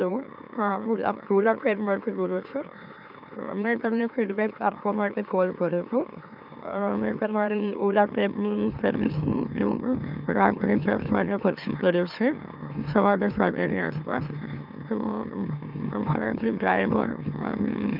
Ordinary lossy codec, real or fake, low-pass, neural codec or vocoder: none; fake; 5.4 kHz; autoencoder, 44.1 kHz, a latent of 192 numbers a frame, MeloTTS